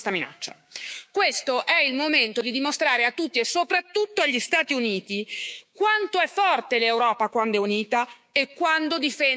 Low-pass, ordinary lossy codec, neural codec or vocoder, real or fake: none; none; codec, 16 kHz, 6 kbps, DAC; fake